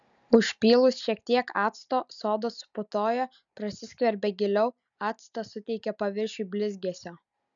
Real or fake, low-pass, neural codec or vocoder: real; 7.2 kHz; none